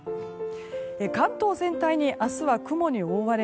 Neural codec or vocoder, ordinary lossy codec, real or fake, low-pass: none; none; real; none